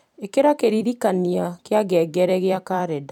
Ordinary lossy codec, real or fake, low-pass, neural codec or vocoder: none; fake; 19.8 kHz; vocoder, 44.1 kHz, 128 mel bands every 256 samples, BigVGAN v2